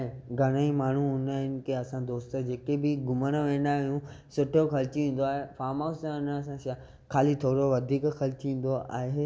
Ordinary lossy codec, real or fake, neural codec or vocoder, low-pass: none; real; none; none